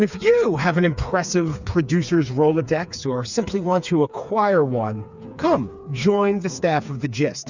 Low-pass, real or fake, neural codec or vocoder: 7.2 kHz; fake; codec, 16 kHz, 4 kbps, FreqCodec, smaller model